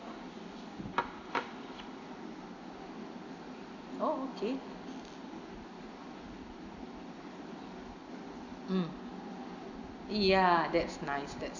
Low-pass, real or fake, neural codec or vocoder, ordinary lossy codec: 7.2 kHz; real; none; none